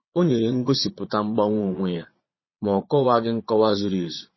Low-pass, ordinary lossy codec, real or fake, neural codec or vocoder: 7.2 kHz; MP3, 24 kbps; fake; vocoder, 22.05 kHz, 80 mel bands, Vocos